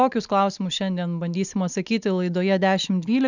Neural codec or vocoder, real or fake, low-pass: none; real; 7.2 kHz